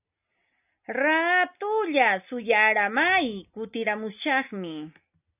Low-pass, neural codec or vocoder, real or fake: 3.6 kHz; none; real